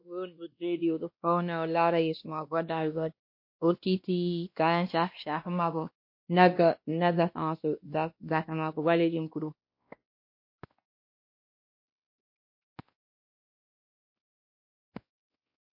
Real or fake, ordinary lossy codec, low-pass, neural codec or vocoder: fake; MP3, 32 kbps; 5.4 kHz; codec, 16 kHz, 1 kbps, X-Codec, WavLM features, trained on Multilingual LibriSpeech